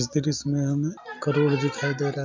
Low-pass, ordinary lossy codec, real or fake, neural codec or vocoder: 7.2 kHz; MP3, 64 kbps; real; none